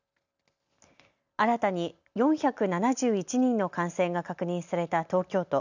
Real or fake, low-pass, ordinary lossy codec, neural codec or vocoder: real; 7.2 kHz; none; none